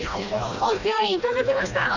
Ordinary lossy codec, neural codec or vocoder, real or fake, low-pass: none; codec, 16 kHz, 1 kbps, FreqCodec, smaller model; fake; 7.2 kHz